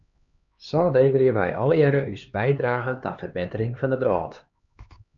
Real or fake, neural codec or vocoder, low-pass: fake; codec, 16 kHz, 2 kbps, X-Codec, HuBERT features, trained on LibriSpeech; 7.2 kHz